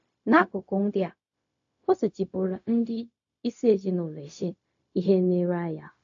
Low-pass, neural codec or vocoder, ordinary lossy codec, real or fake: 7.2 kHz; codec, 16 kHz, 0.4 kbps, LongCat-Audio-Codec; AAC, 64 kbps; fake